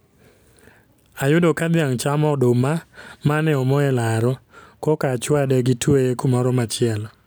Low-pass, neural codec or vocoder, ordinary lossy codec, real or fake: none; none; none; real